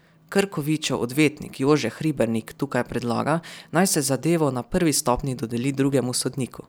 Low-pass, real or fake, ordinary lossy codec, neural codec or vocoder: none; fake; none; vocoder, 44.1 kHz, 128 mel bands every 256 samples, BigVGAN v2